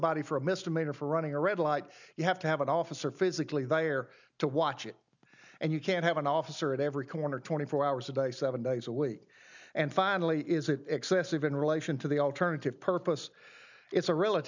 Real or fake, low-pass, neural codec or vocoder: real; 7.2 kHz; none